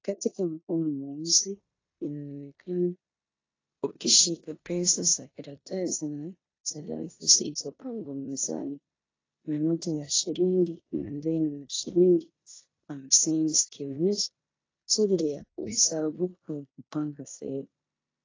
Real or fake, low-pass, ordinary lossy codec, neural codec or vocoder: fake; 7.2 kHz; AAC, 32 kbps; codec, 16 kHz in and 24 kHz out, 0.9 kbps, LongCat-Audio-Codec, four codebook decoder